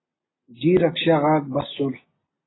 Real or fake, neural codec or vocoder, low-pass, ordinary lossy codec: real; none; 7.2 kHz; AAC, 16 kbps